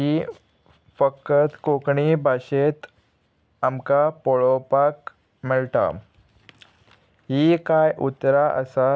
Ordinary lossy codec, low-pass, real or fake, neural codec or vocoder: none; none; real; none